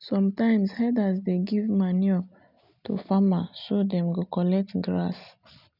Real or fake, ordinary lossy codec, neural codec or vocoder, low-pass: real; none; none; 5.4 kHz